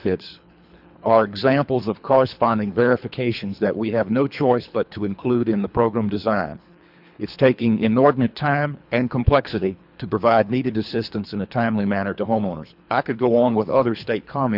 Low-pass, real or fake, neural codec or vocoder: 5.4 kHz; fake; codec, 24 kHz, 3 kbps, HILCodec